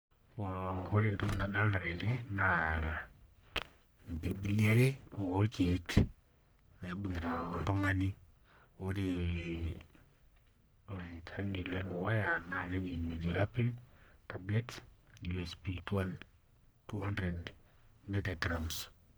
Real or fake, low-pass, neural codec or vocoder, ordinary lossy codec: fake; none; codec, 44.1 kHz, 1.7 kbps, Pupu-Codec; none